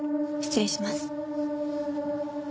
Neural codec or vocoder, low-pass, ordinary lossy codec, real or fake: none; none; none; real